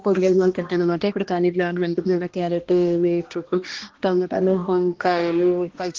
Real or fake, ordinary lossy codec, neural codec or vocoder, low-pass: fake; Opus, 16 kbps; codec, 16 kHz, 1 kbps, X-Codec, HuBERT features, trained on balanced general audio; 7.2 kHz